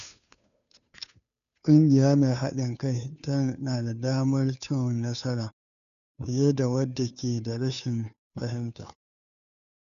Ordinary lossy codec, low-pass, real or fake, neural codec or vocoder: none; 7.2 kHz; fake; codec, 16 kHz, 2 kbps, FunCodec, trained on Chinese and English, 25 frames a second